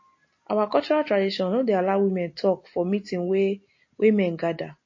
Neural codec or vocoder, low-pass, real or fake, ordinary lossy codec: none; 7.2 kHz; real; MP3, 32 kbps